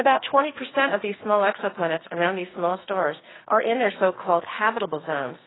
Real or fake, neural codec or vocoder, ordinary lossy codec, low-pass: fake; codec, 44.1 kHz, 2.6 kbps, SNAC; AAC, 16 kbps; 7.2 kHz